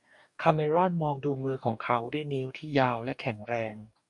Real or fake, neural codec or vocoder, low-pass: fake; codec, 44.1 kHz, 2.6 kbps, DAC; 10.8 kHz